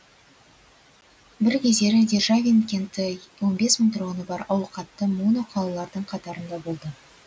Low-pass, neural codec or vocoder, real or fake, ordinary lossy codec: none; none; real; none